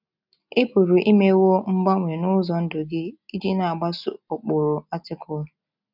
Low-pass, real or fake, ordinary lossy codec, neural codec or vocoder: 5.4 kHz; real; none; none